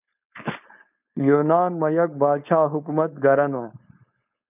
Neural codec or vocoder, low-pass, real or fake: codec, 16 kHz, 4.8 kbps, FACodec; 3.6 kHz; fake